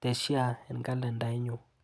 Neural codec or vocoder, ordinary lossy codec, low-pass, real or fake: none; none; none; real